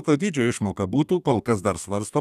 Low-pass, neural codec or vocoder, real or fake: 14.4 kHz; codec, 32 kHz, 1.9 kbps, SNAC; fake